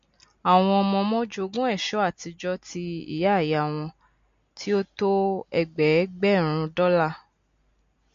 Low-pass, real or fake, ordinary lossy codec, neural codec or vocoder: 7.2 kHz; real; MP3, 48 kbps; none